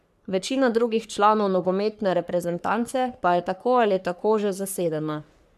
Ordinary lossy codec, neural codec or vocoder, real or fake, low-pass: none; codec, 44.1 kHz, 3.4 kbps, Pupu-Codec; fake; 14.4 kHz